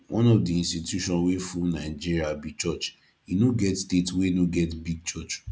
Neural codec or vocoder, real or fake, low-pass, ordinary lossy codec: none; real; none; none